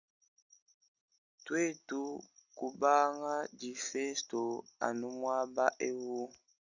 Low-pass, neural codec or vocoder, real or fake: 7.2 kHz; none; real